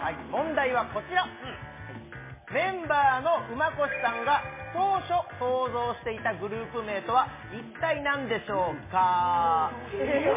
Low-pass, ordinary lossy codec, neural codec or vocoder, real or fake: 3.6 kHz; MP3, 16 kbps; none; real